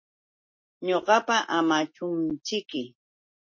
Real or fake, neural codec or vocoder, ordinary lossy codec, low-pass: real; none; MP3, 32 kbps; 7.2 kHz